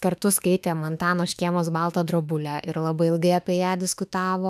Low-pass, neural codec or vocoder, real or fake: 14.4 kHz; autoencoder, 48 kHz, 32 numbers a frame, DAC-VAE, trained on Japanese speech; fake